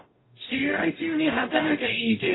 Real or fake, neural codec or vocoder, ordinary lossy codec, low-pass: fake; codec, 44.1 kHz, 0.9 kbps, DAC; AAC, 16 kbps; 7.2 kHz